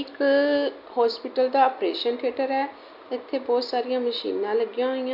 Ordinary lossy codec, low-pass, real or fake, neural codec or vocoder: MP3, 48 kbps; 5.4 kHz; real; none